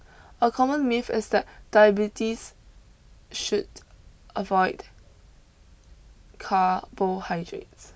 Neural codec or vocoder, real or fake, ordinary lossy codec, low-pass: none; real; none; none